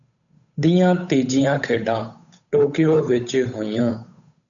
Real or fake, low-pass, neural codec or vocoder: fake; 7.2 kHz; codec, 16 kHz, 8 kbps, FunCodec, trained on Chinese and English, 25 frames a second